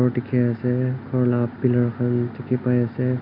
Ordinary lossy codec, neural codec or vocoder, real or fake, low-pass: none; none; real; 5.4 kHz